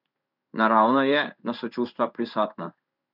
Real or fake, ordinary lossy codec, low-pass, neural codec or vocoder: fake; none; 5.4 kHz; codec, 16 kHz in and 24 kHz out, 1 kbps, XY-Tokenizer